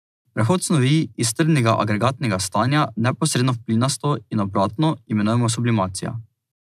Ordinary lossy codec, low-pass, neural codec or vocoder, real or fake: none; 14.4 kHz; none; real